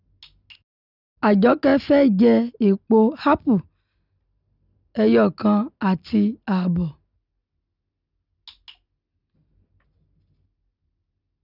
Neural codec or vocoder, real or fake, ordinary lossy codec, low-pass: none; real; none; 5.4 kHz